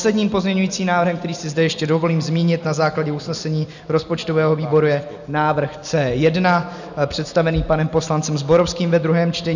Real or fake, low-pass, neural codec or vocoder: real; 7.2 kHz; none